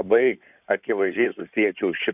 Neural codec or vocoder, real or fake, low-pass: codec, 16 kHz in and 24 kHz out, 2.2 kbps, FireRedTTS-2 codec; fake; 3.6 kHz